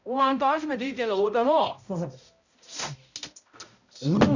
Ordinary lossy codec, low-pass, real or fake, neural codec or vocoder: none; 7.2 kHz; fake; codec, 16 kHz, 0.5 kbps, X-Codec, HuBERT features, trained on balanced general audio